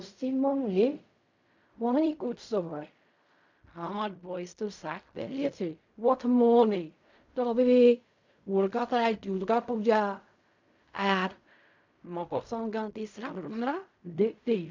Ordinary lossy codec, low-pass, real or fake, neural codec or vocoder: MP3, 64 kbps; 7.2 kHz; fake; codec, 16 kHz in and 24 kHz out, 0.4 kbps, LongCat-Audio-Codec, fine tuned four codebook decoder